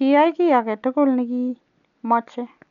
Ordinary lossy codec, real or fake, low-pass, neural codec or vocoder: none; real; 7.2 kHz; none